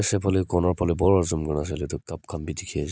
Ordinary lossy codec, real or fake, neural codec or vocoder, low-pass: none; real; none; none